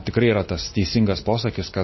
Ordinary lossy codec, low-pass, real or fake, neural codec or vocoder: MP3, 24 kbps; 7.2 kHz; real; none